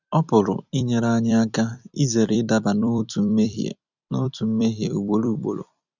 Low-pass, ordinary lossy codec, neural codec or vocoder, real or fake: 7.2 kHz; none; vocoder, 44.1 kHz, 128 mel bands every 256 samples, BigVGAN v2; fake